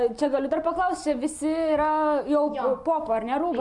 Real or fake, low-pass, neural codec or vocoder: real; 10.8 kHz; none